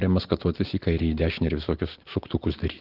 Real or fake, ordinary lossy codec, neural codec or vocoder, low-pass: real; Opus, 16 kbps; none; 5.4 kHz